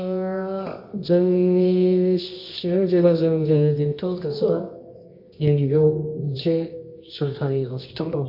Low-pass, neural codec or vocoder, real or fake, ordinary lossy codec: 5.4 kHz; codec, 24 kHz, 0.9 kbps, WavTokenizer, medium music audio release; fake; MP3, 32 kbps